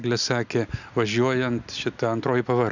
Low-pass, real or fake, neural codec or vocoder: 7.2 kHz; real; none